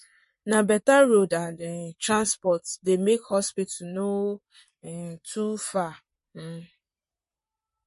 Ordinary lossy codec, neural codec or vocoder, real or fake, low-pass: MP3, 48 kbps; vocoder, 44.1 kHz, 128 mel bands, Pupu-Vocoder; fake; 14.4 kHz